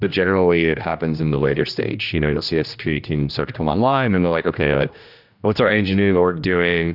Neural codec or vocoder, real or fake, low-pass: codec, 16 kHz, 1 kbps, X-Codec, HuBERT features, trained on general audio; fake; 5.4 kHz